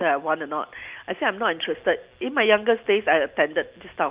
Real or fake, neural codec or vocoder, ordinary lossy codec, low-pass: real; none; Opus, 32 kbps; 3.6 kHz